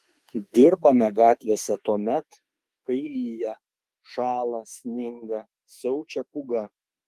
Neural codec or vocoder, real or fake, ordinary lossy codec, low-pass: autoencoder, 48 kHz, 32 numbers a frame, DAC-VAE, trained on Japanese speech; fake; Opus, 32 kbps; 14.4 kHz